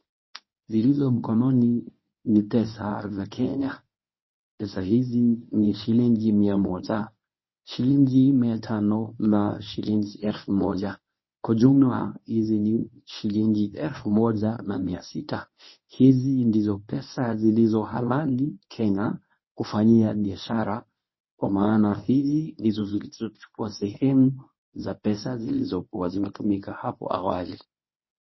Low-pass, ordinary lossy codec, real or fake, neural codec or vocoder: 7.2 kHz; MP3, 24 kbps; fake; codec, 24 kHz, 0.9 kbps, WavTokenizer, medium speech release version 1